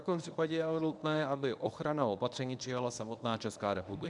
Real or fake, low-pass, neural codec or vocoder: fake; 10.8 kHz; codec, 24 kHz, 0.9 kbps, WavTokenizer, medium speech release version 1